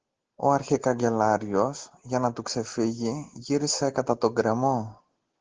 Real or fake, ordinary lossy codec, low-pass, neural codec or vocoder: real; Opus, 16 kbps; 7.2 kHz; none